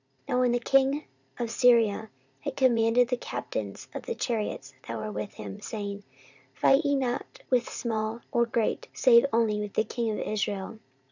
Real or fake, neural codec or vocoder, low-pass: fake; vocoder, 44.1 kHz, 80 mel bands, Vocos; 7.2 kHz